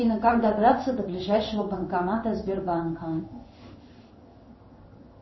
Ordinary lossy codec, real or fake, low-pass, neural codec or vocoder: MP3, 24 kbps; fake; 7.2 kHz; codec, 16 kHz in and 24 kHz out, 1 kbps, XY-Tokenizer